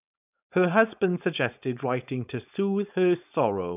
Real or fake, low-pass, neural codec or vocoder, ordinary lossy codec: fake; 3.6 kHz; codec, 16 kHz, 4.8 kbps, FACodec; none